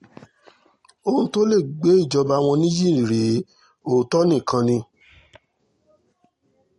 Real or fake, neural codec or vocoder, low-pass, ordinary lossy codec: real; none; 19.8 kHz; MP3, 48 kbps